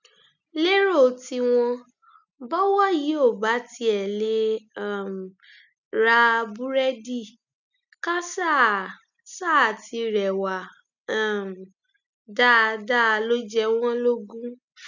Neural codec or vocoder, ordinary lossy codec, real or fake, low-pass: none; none; real; 7.2 kHz